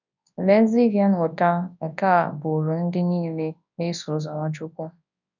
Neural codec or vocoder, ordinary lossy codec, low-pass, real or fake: codec, 24 kHz, 0.9 kbps, WavTokenizer, large speech release; none; 7.2 kHz; fake